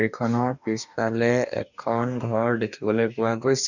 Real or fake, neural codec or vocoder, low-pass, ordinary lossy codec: fake; codec, 44.1 kHz, 2.6 kbps, DAC; 7.2 kHz; none